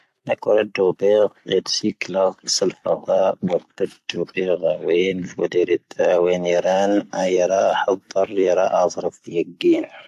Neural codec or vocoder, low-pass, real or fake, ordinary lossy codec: vocoder, 44.1 kHz, 128 mel bands every 256 samples, BigVGAN v2; 14.4 kHz; fake; AAC, 64 kbps